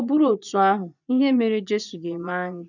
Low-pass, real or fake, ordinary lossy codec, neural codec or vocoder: 7.2 kHz; fake; none; vocoder, 24 kHz, 100 mel bands, Vocos